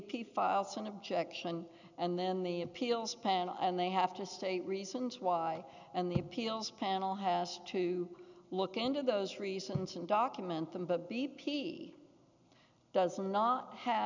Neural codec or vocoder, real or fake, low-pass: none; real; 7.2 kHz